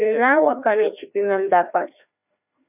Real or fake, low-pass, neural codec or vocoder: fake; 3.6 kHz; codec, 16 kHz, 1 kbps, FreqCodec, larger model